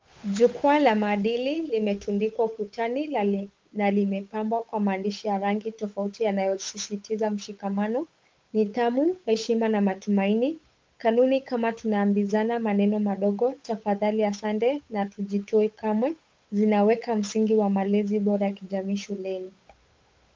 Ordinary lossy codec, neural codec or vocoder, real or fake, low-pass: Opus, 32 kbps; codec, 16 kHz, 8 kbps, FunCodec, trained on Chinese and English, 25 frames a second; fake; 7.2 kHz